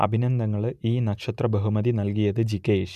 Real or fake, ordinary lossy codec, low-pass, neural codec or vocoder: real; none; 14.4 kHz; none